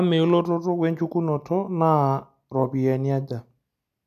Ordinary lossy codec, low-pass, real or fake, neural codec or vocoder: none; 14.4 kHz; real; none